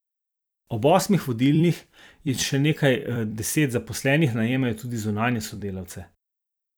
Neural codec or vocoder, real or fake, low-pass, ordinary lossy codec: vocoder, 44.1 kHz, 128 mel bands every 256 samples, BigVGAN v2; fake; none; none